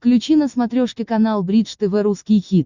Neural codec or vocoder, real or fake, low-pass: none; real; 7.2 kHz